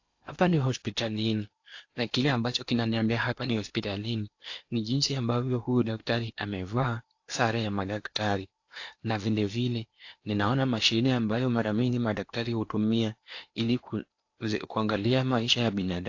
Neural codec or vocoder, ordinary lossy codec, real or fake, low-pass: codec, 16 kHz in and 24 kHz out, 0.8 kbps, FocalCodec, streaming, 65536 codes; AAC, 48 kbps; fake; 7.2 kHz